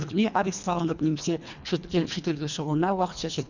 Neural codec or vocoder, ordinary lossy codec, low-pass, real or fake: codec, 24 kHz, 1.5 kbps, HILCodec; none; 7.2 kHz; fake